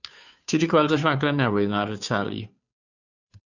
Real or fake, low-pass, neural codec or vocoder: fake; 7.2 kHz; codec, 16 kHz, 2 kbps, FunCodec, trained on Chinese and English, 25 frames a second